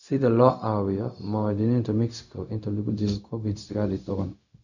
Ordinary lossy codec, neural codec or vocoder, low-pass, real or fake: none; codec, 16 kHz, 0.4 kbps, LongCat-Audio-Codec; 7.2 kHz; fake